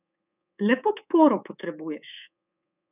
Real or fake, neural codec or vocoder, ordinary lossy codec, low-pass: fake; codec, 24 kHz, 3.1 kbps, DualCodec; none; 3.6 kHz